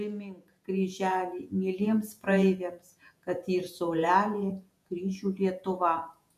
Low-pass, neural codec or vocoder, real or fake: 14.4 kHz; vocoder, 48 kHz, 128 mel bands, Vocos; fake